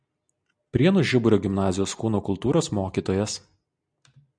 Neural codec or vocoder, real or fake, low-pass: none; real; 9.9 kHz